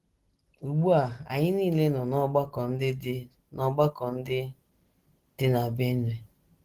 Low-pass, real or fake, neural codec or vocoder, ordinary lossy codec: 14.4 kHz; real; none; Opus, 16 kbps